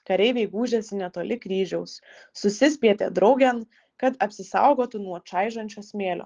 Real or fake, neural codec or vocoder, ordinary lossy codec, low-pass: real; none; Opus, 16 kbps; 7.2 kHz